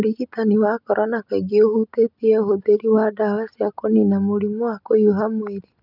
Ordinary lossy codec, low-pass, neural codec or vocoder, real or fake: none; 5.4 kHz; none; real